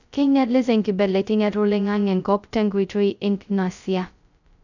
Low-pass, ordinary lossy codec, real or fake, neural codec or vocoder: 7.2 kHz; none; fake; codec, 16 kHz, 0.2 kbps, FocalCodec